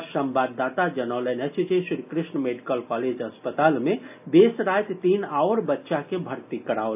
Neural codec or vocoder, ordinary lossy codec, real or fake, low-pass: none; AAC, 32 kbps; real; 3.6 kHz